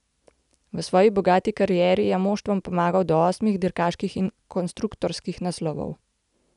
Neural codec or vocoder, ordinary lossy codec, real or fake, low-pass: none; none; real; 10.8 kHz